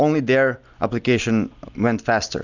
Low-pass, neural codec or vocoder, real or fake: 7.2 kHz; none; real